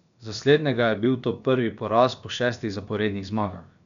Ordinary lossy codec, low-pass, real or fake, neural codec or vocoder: none; 7.2 kHz; fake; codec, 16 kHz, about 1 kbps, DyCAST, with the encoder's durations